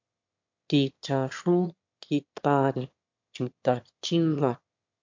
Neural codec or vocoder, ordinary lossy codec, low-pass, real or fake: autoencoder, 22.05 kHz, a latent of 192 numbers a frame, VITS, trained on one speaker; MP3, 48 kbps; 7.2 kHz; fake